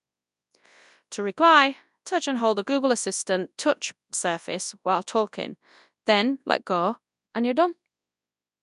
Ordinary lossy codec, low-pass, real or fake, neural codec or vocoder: none; 10.8 kHz; fake; codec, 24 kHz, 0.9 kbps, WavTokenizer, large speech release